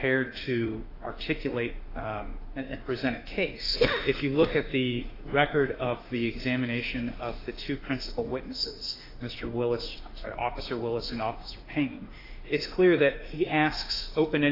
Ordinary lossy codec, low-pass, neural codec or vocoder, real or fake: AAC, 24 kbps; 5.4 kHz; autoencoder, 48 kHz, 32 numbers a frame, DAC-VAE, trained on Japanese speech; fake